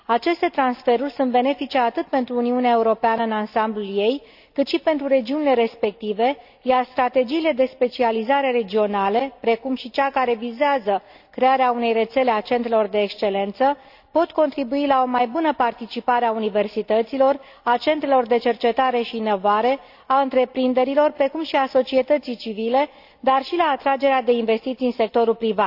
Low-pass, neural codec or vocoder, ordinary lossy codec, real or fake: 5.4 kHz; none; AAC, 48 kbps; real